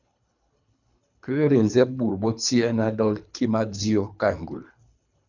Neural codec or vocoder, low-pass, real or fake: codec, 24 kHz, 3 kbps, HILCodec; 7.2 kHz; fake